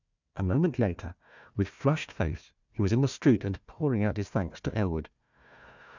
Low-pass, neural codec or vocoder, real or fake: 7.2 kHz; codec, 16 kHz, 1 kbps, FunCodec, trained on Chinese and English, 50 frames a second; fake